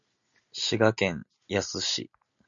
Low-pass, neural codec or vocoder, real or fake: 7.2 kHz; none; real